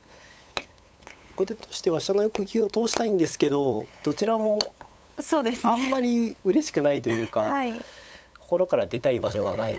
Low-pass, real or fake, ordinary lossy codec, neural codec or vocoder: none; fake; none; codec, 16 kHz, 8 kbps, FunCodec, trained on LibriTTS, 25 frames a second